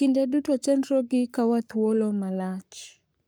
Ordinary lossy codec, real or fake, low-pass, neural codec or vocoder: none; fake; none; codec, 44.1 kHz, 7.8 kbps, Pupu-Codec